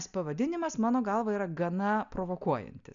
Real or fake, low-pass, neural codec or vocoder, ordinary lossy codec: real; 7.2 kHz; none; MP3, 96 kbps